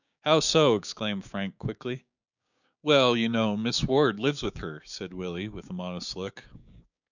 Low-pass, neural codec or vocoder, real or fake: 7.2 kHz; codec, 16 kHz, 6 kbps, DAC; fake